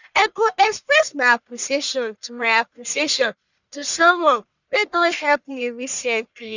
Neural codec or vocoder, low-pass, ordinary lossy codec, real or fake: codec, 44.1 kHz, 1.7 kbps, Pupu-Codec; 7.2 kHz; none; fake